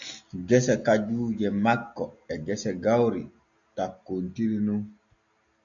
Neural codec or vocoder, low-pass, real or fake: none; 7.2 kHz; real